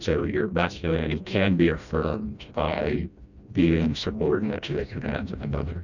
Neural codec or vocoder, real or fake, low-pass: codec, 16 kHz, 1 kbps, FreqCodec, smaller model; fake; 7.2 kHz